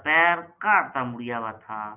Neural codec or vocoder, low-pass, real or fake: none; 3.6 kHz; real